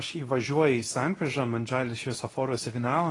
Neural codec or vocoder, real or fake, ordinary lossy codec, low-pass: codec, 24 kHz, 0.9 kbps, WavTokenizer, medium speech release version 2; fake; AAC, 32 kbps; 10.8 kHz